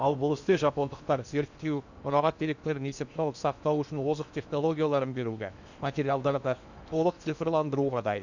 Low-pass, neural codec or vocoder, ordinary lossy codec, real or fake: 7.2 kHz; codec, 16 kHz in and 24 kHz out, 0.8 kbps, FocalCodec, streaming, 65536 codes; none; fake